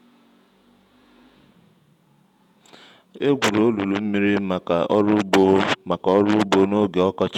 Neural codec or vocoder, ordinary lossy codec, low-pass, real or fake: vocoder, 48 kHz, 128 mel bands, Vocos; none; 19.8 kHz; fake